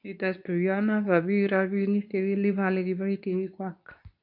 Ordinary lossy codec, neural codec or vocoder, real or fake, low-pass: none; codec, 24 kHz, 0.9 kbps, WavTokenizer, medium speech release version 2; fake; 5.4 kHz